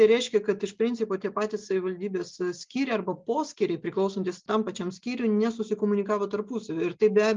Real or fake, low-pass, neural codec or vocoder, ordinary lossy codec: real; 7.2 kHz; none; Opus, 24 kbps